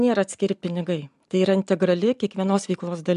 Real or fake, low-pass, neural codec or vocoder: real; 10.8 kHz; none